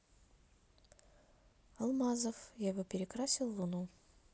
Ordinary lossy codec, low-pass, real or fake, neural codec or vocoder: none; none; real; none